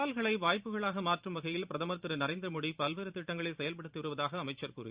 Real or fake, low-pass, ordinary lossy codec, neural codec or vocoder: fake; 3.6 kHz; Opus, 64 kbps; vocoder, 44.1 kHz, 128 mel bands every 512 samples, BigVGAN v2